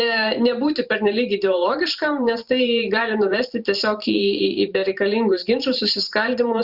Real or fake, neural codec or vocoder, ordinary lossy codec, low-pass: fake; vocoder, 44.1 kHz, 128 mel bands every 512 samples, BigVGAN v2; Opus, 64 kbps; 5.4 kHz